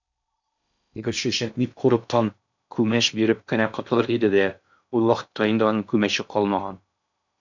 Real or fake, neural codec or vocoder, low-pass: fake; codec, 16 kHz in and 24 kHz out, 0.6 kbps, FocalCodec, streaming, 4096 codes; 7.2 kHz